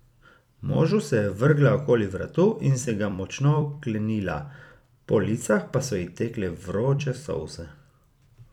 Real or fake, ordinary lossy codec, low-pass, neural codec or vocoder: fake; none; 19.8 kHz; vocoder, 44.1 kHz, 128 mel bands every 256 samples, BigVGAN v2